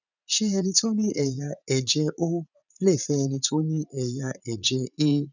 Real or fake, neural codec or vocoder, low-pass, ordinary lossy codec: fake; vocoder, 44.1 kHz, 80 mel bands, Vocos; 7.2 kHz; none